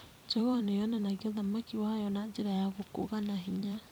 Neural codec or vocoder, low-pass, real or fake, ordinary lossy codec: vocoder, 44.1 kHz, 128 mel bands every 256 samples, BigVGAN v2; none; fake; none